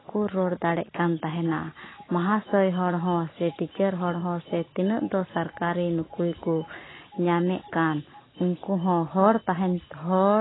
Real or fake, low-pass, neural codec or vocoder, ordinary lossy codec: real; 7.2 kHz; none; AAC, 16 kbps